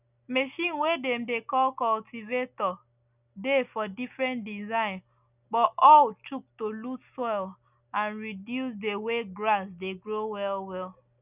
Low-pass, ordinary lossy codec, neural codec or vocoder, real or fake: 3.6 kHz; none; none; real